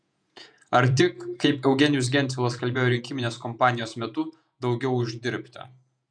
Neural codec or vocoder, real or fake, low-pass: vocoder, 48 kHz, 128 mel bands, Vocos; fake; 9.9 kHz